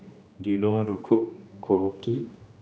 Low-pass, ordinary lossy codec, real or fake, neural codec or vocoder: none; none; fake; codec, 16 kHz, 1 kbps, X-Codec, HuBERT features, trained on general audio